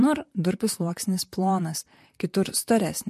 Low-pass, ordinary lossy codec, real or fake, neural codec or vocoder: 14.4 kHz; MP3, 64 kbps; fake; vocoder, 44.1 kHz, 128 mel bands, Pupu-Vocoder